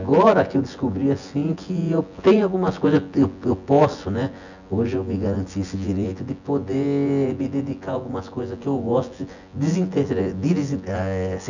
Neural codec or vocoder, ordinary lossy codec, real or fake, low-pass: vocoder, 24 kHz, 100 mel bands, Vocos; none; fake; 7.2 kHz